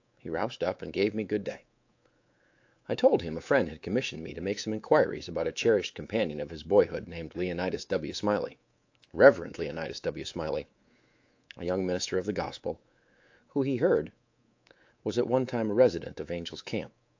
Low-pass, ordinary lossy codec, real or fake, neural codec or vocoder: 7.2 kHz; AAC, 48 kbps; fake; codec, 24 kHz, 3.1 kbps, DualCodec